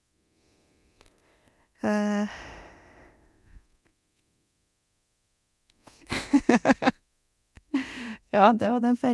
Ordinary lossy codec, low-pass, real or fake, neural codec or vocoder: none; none; fake; codec, 24 kHz, 0.9 kbps, DualCodec